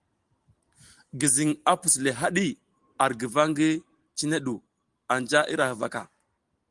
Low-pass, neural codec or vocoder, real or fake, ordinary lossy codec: 10.8 kHz; none; real; Opus, 24 kbps